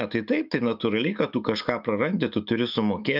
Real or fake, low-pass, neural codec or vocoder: fake; 5.4 kHz; autoencoder, 48 kHz, 128 numbers a frame, DAC-VAE, trained on Japanese speech